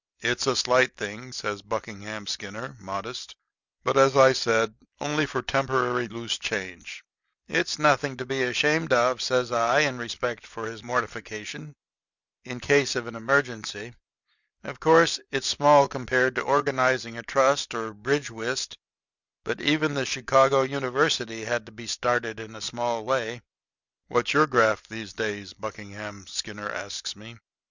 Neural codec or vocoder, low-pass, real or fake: none; 7.2 kHz; real